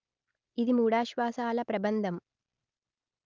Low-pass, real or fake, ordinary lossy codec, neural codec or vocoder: 7.2 kHz; real; Opus, 24 kbps; none